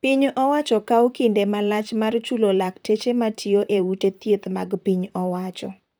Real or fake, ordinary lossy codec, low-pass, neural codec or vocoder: fake; none; none; vocoder, 44.1 kHz, 128 mel bands, Pupu-Vocoder